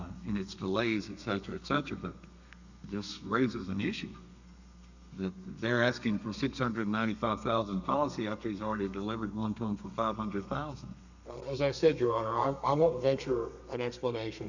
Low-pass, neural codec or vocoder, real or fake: 7.2 kHz; codec, 32 kHz, 1.9 kbps, SNAC; fake